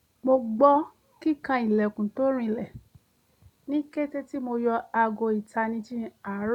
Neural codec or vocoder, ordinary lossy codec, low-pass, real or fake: none; none; 19.8 kHz; real